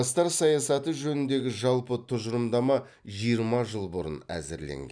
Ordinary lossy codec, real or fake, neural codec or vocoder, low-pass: none; real; none; 9.9 kHz